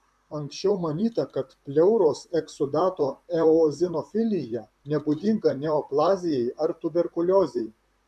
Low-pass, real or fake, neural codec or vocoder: 14.4 kHz; fake; vocoder, 44.1 kHz, 128 mel bands, Pupu-Vocoder